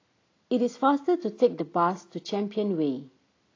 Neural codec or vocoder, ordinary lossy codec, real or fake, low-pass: none; AAC, 32 kbps; real; 7.2 kHz